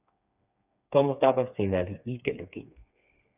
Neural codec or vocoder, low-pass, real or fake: codec, 16 kHz, 4 kbps, FreqCodec, smaller model; 3.6 kHz; fake